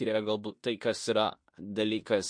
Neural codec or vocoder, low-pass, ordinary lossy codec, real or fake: codec, 16 kHz in and 24 kHz out, 0.9 kbps, LongCat-Audio-Codec, fine tuned four codebook decoder; 9.9 kHz; MP3, 48 kbps; fake